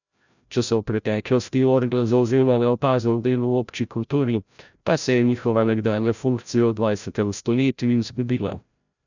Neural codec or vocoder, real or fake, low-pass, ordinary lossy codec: codec, 16 kHz, 0.5 kbps, FreqCodec, larger model; fake; 7.2 kHz; none